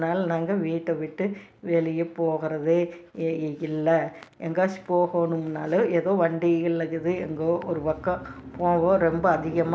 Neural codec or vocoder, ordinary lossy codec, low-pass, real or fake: none; none; none; real